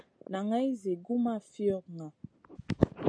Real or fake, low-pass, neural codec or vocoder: real; 9.9 kHz; none